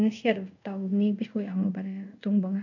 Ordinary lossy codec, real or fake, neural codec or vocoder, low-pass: none; fake; codec, 24 kHz, 0.5 kbps, DualCodec; 7.2 kHz